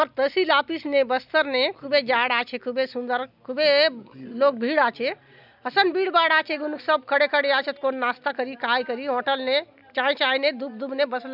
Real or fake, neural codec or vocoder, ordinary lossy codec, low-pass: real; none; none; 5.4 kHz